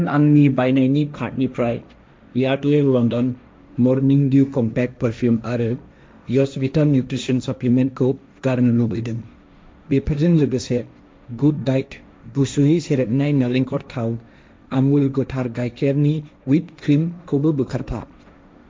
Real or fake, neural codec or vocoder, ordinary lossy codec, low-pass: fake; codec, 16 kHz, 1.1 kbps, Voila-Tokenizer; none; none